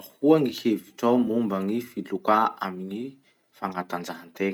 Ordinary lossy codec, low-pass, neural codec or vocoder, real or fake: none; 19.8 kHz; none; real